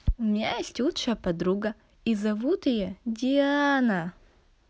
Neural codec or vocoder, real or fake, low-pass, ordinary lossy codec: none; real; none; none